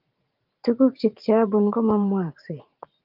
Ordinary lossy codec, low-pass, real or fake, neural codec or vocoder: Opus, 32 kbps; 5.4 kHz; fake; vocoder, 44.1 kHz, 80 mel bands, Vocos